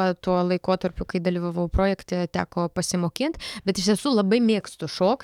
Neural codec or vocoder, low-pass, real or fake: codec, 44.1 kHz, 7.8 kbps, DAC; 19.8 kHz; fake